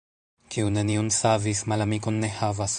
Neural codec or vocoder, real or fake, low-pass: vocoder, 44.1 kHz, 128 mel bands every 512 samples, BigVGAN v2; fake; 10.8 kHz